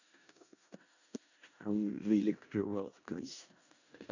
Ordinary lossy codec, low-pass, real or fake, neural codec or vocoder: none; 7.2 kHz; fake; codec, 16 kHz in and 24 kHz out, 0.4 kbps, LongCat-Audio-Codec, four codebook decoder